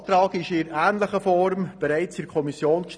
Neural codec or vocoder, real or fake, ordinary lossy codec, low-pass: none; real; none; 9.9 kHz